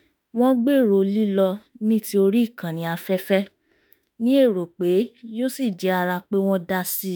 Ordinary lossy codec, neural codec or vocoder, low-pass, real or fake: none; autoencoder, 48 kHz, 32 numbers a frame, DAC-VAE, trained on Japanese speech; none; fake